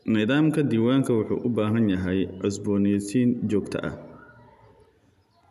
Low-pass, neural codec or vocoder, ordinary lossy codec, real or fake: 14.4 kHz; none; none; real